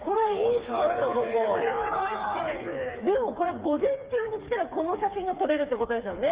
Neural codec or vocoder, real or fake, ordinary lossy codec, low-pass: codec, 16 kHz, 4 kbps, FreqCodec, smaller model; fake; Opus, 32 kbps; 3.6 kHz